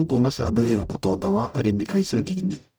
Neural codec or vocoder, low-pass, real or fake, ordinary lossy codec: codec, 44.1 kHz, 0.9 kbps, DAC; none; fake; none